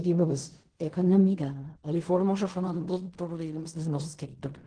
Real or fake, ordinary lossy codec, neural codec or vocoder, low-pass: fake; Opus, 16 kbps; codec, 16 kHz in and 24 kHz out, 0.4 kbps, LongCat-Audio-Codec, fine tuned four codebook decoder; 9.9 kHz